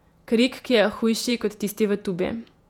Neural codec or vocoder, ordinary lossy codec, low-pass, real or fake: vocoder, 48 kHz, 128 mel bands, Vocos; none; 19.8 kHz; fake